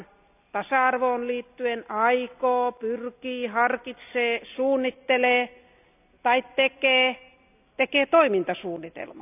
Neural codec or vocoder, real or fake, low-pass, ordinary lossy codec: none; real; 3.6 kHz; none